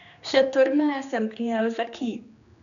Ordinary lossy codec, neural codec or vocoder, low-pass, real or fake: none; codec, 16 kHz, 2 kbps, X-Codec, HuBERT features, trained on general audio; 7.2 kHz; fake